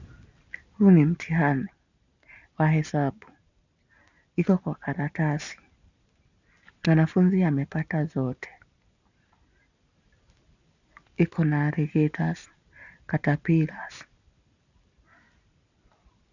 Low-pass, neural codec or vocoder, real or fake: 7.2 kHz; none; real